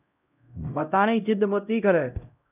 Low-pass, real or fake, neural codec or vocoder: 3.6 kHz; fake; codec, 16 kHz, 0.5 kbps, X-Codec, HuBERT features, trained on LibriSpeech